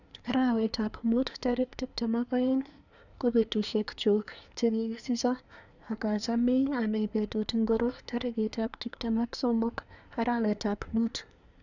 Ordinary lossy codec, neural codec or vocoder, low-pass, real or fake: none; codec, 24 kHz, 1 kbps, SNAC; 7.2 kHz; fake